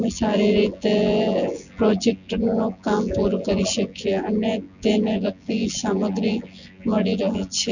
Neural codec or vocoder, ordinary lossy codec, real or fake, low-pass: vocoder, 24 kHz, 100 mel bands, Vocos; none; fake; 7.2 kHz